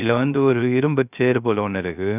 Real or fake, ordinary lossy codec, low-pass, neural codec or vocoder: fake; none; 3.6 kHz; codec, 16 kHz, 0.3 kbps, FocalCodec